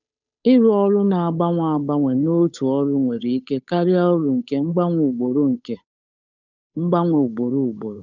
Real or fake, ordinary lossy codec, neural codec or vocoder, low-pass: fake; none; codec, 16 kHz, 8 kbps, FunCodec, trained on Chinese and English, 25 frames a second; 7.2 kHz